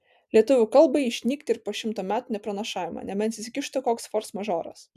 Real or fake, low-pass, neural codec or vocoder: real; 14.4 kHz; none